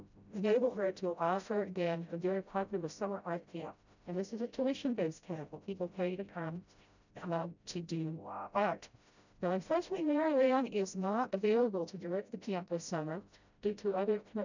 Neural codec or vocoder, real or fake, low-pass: codec, 16 kHz, 0.5 kbps, FreqCodec, smaller model; fake; 7.2 kHz